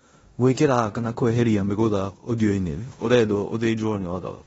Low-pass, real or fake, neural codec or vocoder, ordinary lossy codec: 10.8 kHz; fake; codec, 16 kHz in and 24 kHz out, 0.9 kbps, LongCat-Audio-Codec, four codebook decoder; AAC, 24 kbps